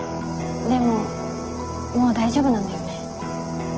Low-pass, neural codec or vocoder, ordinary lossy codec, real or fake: 7.2 kHz; none; Opus, 16 kbps; real